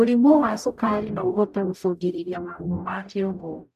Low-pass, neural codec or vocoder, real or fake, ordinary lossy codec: 14.4 kHz; codec, 44.1 kHz, 0.9 kbps, DAC; fake; none